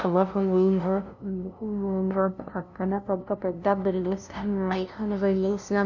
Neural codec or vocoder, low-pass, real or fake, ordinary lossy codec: codec, 16 kHz, 0.5 kbps, FunCodec, trained on LibriTTS, 25 frames a second; 7.2 kHz; fake; none